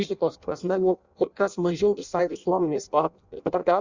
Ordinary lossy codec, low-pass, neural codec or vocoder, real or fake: Opus, 64 kbps; 7.2 kHz; codec, 16 kHz in and 24 kHz out, 0.6 kbps, FireRedTTS-2 codec; fake